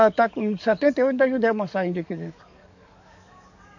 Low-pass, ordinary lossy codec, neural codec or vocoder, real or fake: 7.2 kHz; none; codec, 44.1 kHz, 7.8 kbps, DAC; fake